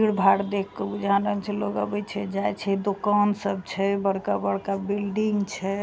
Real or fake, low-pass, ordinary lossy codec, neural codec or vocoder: real; none; none; none